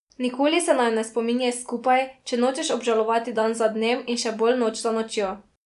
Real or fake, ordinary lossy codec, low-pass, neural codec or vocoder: real; none; 10.8 kHz; none